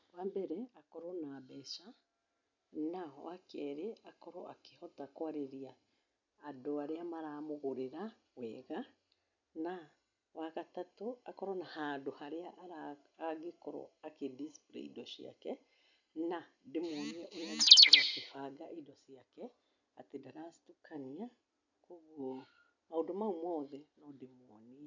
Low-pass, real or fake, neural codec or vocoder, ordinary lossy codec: 7.2 kHz; real; none; none